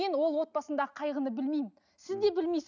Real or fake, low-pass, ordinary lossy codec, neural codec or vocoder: real; 7.2 kHz; none; none